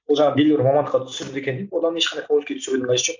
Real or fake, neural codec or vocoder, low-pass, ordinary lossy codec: real; none; 7.2 kHz; MP3, 64 kbps